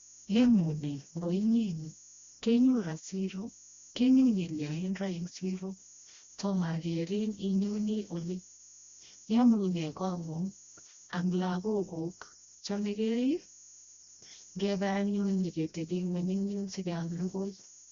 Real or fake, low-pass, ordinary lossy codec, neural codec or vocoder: fake; 7.2 kHz; Opus, 64 kbps; codec, 16 kHz, 1 kbps, FreqCodec, smaller model